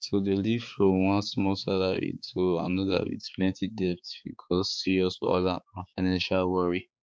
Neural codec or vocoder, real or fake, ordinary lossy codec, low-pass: codec, 16 kHz, 4 kbps, X-Codec, HuBERT features, trained on balanced general audio; fake; none; none